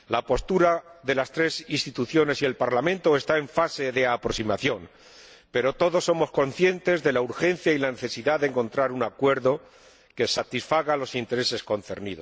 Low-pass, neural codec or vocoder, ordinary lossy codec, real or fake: none; none; none; real